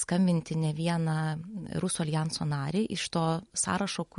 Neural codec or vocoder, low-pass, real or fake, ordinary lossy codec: none; 19.8 kHz; real; MP3, 48 kbps